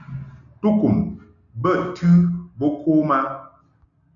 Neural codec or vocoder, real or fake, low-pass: none; real; 7.2 kHz